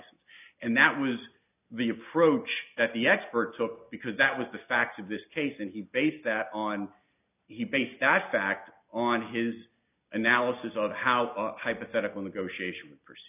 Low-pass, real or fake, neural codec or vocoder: 3.6 kHz; real; none